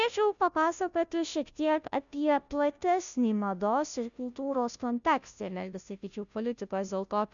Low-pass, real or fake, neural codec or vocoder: 7.2 kHz; fake; codec, 16 kHz, 0.5 kbps, FunCodec, trained on Chinese and English, 25 frames a second